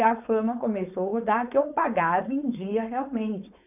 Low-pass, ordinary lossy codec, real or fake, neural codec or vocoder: 3.6 kHz; none; fake; codec, 16 kHz, 4.8 kbps, FACodec